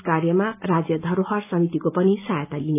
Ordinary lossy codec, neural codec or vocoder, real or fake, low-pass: AAC, 32 kbps; none; real; 3.6 kHz